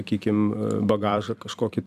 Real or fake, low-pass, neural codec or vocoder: fake; 14.4 kHz; vocoder, 44.1 kHz, 128 mel bands every 256 samples, BigVGAN v2